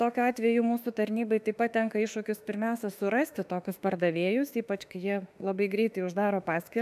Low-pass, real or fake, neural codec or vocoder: 14.4 kHz; fake; autoencoder, 48 kHz, 32 numbers a frame, DAC-VAE, trained on Japanese speech